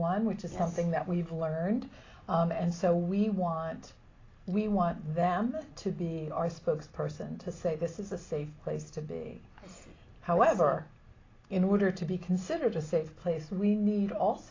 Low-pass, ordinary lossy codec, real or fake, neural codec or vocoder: 7.2 kHz; AAC, 32 kbps; real; none